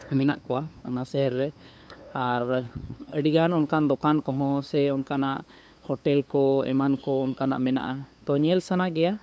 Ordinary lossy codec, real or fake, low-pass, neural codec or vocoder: none; fake; none; codec, 16 kHz, 2 kbps, FunCodec, trained on LibriTTS, 25 frames a second